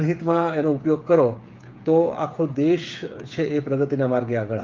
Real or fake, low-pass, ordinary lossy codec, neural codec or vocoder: fake; 7.2 kHz; Opus, 32 kbps; codec, 16 kHz, 8 kbps, FreqCodec, smaller model